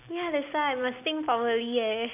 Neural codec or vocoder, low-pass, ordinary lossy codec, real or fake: none; 3.6 kHz; none; real